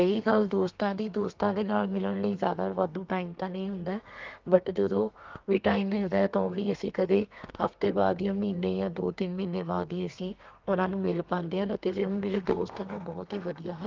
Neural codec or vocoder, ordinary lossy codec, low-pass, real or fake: codec, 32 kHz, 1.9 kbps, SNAC; Opus, 24 kbps; 7.2 kHz; fake